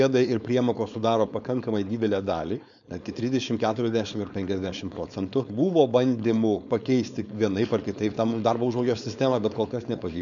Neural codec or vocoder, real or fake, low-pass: codec, 16 kHz, 4.8 kbps, FACodec; fake; 7.2 kHz